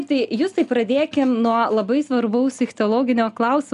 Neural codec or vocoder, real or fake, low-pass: none; real; 10.8 kHz